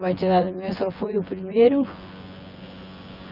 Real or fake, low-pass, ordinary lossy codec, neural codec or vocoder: fake; 5.4 kHz; Opus, 24 kbps; vocoder, 24 kHz, 100 mel bands, Vocos